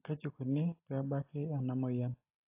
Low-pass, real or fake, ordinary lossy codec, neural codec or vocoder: 3.6 kHz; real; AAC, 32 kbps; none